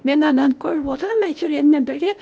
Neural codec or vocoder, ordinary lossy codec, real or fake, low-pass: codec, 16 kHz, 0.8 kbps, ZipCodec; none; fake; none